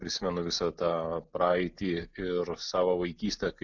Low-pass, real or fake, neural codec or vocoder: 7.2 kHz; real; none